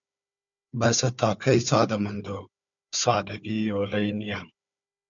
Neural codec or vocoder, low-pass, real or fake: codec, 16 kHz, 4 kbps, FunCodec, trained on Chinese and English, 50 frames a second; 7.2 kHz; fake